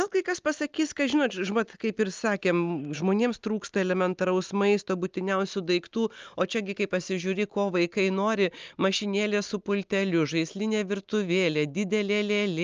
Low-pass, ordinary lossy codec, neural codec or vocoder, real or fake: 7.2 kHz; Opus, 24 kbps; none; real